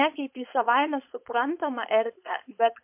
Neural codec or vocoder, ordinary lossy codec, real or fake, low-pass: codec, 16 kHz, 4.8 kbps, FACodec; MP3, 32 kbps; fake; 3.6 kHz